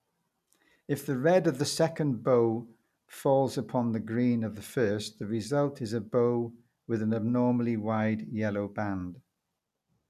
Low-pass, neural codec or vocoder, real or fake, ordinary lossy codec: 14.4 kHz; none; real; none